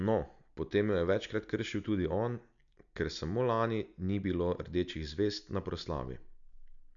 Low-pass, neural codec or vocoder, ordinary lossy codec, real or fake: 7.2 kHz; none; none; real